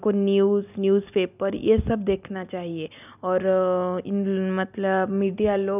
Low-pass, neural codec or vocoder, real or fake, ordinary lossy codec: 3.6 kHz; none; real; none